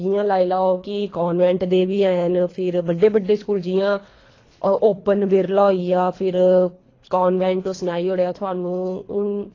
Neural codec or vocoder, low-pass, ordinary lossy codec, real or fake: codec, 24 kHz, 3 kbps, HILCodec; 7.2 kHz; AAC, 32 kbps; fake